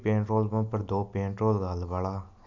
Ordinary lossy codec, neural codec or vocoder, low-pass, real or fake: none; none; 7.2 kHz; real